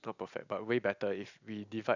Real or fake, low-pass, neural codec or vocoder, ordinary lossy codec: real; 7.2 kHz; none; none